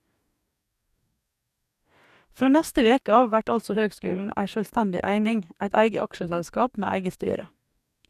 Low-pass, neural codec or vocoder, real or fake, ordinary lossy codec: 14.4 kHz; codec, 44.1 kHz, 2.6 kbps, DAC; fake; none